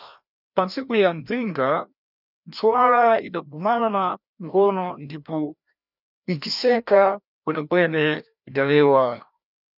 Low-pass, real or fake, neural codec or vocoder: 5.4 kHz; fake; codec, 16 kHz, 1 kbps, FreqCodec, larger model